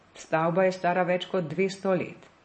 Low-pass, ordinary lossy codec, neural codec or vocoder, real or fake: 10.8 kHz; MP3, 32 kbps; none; real